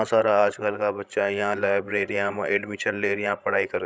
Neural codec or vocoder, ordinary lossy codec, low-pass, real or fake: codec, 16 kHz, 16 kbps, FreqCodec, larger model; none; none; fake